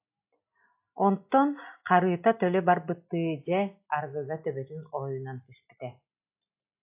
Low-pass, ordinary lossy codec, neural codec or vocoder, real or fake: 3.6 kHz; AAC, 32 kbps; none; real